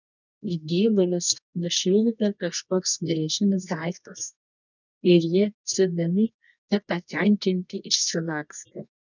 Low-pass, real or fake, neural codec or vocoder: 7.2 kHz; fake; codec, 24 kHz, 0.9 kbps, WavTokenizer, medium music audio release